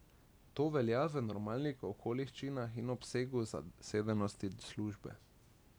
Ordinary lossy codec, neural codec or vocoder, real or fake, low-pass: none; none; real; none